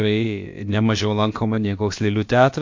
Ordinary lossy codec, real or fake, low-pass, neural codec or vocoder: MP3, 48 kbps; fake; 7.2 kHz; codec, 16 kHz, about 1 kbps, DyCAST, with the encoder's durations